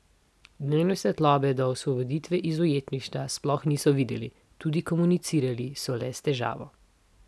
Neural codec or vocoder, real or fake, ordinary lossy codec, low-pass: none; real; none; none